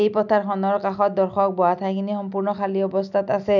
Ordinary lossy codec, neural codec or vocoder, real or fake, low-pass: none; none; real; 7.2 kHz